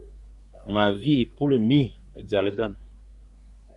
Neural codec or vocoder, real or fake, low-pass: codec, 24 kHz, 1 kbps, SNAC; fake; 10.8 kHz